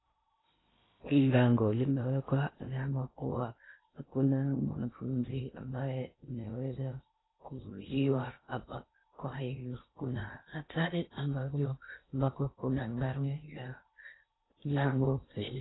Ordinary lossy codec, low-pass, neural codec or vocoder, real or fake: AAC, 16 kbps; 7.2 kHz; codec, 16 kHz in and 24 kHz out, 0.6 kbps, FocalCodec, streaming, 2048 codes; fake